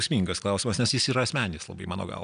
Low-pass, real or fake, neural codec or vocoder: 9.9 kHz; real; none